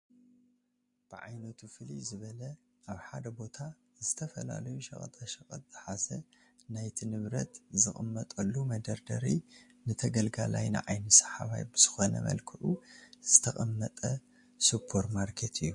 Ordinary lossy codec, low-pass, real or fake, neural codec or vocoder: MP3, 48 kbps; 10.8 kHz; real; none